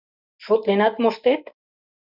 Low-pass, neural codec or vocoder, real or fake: 5.4 kHz; none; real